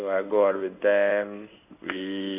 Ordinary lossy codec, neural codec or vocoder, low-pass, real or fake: none; codec, 16 kHz in and 24 kHz out, 1 kbps, XY-Tokenizer; 3.6 kHz; fake